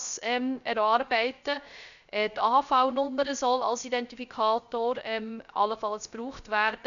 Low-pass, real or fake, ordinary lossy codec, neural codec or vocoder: 7.2 kHz; fake; none; codec, 16 kHz, 0.3 kbps, FocalCodec